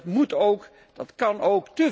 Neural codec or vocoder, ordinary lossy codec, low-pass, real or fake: none; none; none; real